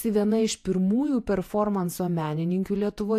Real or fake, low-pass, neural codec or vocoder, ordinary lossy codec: fake; 14.4 kHz; vocoder, 48 kHz, 128 mel bands, Vocos; AAC, 64 kbps